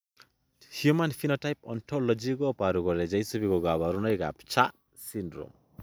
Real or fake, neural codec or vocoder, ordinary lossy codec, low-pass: real; none; none; none